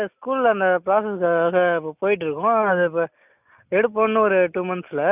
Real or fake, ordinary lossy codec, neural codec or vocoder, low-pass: real; none; none; 3.6 kHz